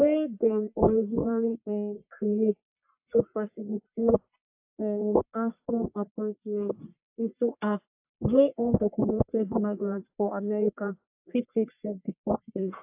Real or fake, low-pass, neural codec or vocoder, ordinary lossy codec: fake; 3.6 kHz; codec, 44.1 kHz, 1.7 kbps, Pupu-Codec; AAC, 32 kbps